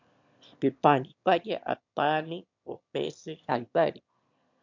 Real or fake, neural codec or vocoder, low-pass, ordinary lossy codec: fake; autoencoder, 22.05 kHz, a latent of 192 numbers a frame, VITS, trained on one speaker; 7.2 kHz; MP3, 64 kbps